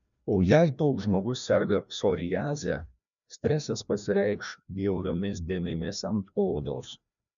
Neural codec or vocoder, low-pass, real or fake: codec, 16 kHz, 1 kbps, FreqCodec, larger model; 7.2 kHz; fake